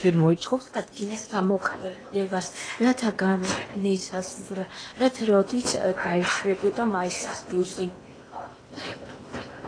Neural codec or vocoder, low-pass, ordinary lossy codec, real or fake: codec, 16 kHz in and 24 kHz out, 0.8 kbps, FocalCodec, streaming, 65536 codes; 9.9 kHz; AAC, 32 kbps; fake